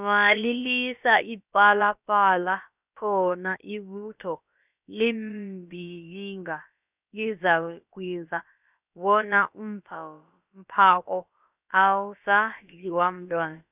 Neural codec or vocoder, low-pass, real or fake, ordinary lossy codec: codec, 16 kHz, about 1 kbps, DyCAST, with the encoder's durations; 3.6 kHz; fake; none